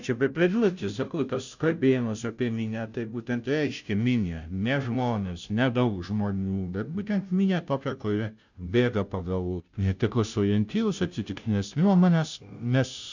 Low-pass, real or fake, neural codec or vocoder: 7.2 kHz; fake; codec, 16 kHz, 0.5 kbps, FunCodec, trained on Chinese and English, 25 frames a second